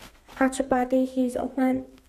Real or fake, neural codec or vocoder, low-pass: fake; codec, 32 kHz, 1.9 kbps, SNAC; 14.4 kHz